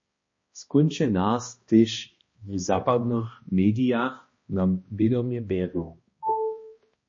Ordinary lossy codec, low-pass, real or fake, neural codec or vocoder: MP3, 32 kbps; 7.2 kHz; fake; codec, 16 kHz, 1 kbps, X-Codec, HuBERT features, trained on balanced general audio